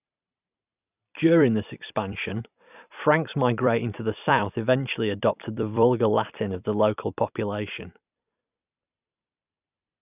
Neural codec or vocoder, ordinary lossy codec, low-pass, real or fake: vocoder, 44.1 kHz, 128 mel bands every 256 samples, BigVGAN v2; none; 3.6 kHz; fake